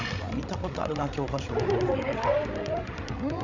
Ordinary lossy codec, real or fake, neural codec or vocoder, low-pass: MP3, 64 kbps; fake; codec, 16 kHz, 16 kbps, FreqCodec, larger model; 7.2 kHz